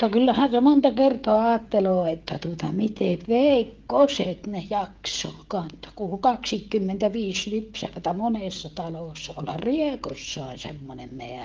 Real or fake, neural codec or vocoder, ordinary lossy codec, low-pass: fake; codec, 16 kHz, 16 kbps, FreqCodec, smaller model; Opus, 32 kbps; 7.2 kHz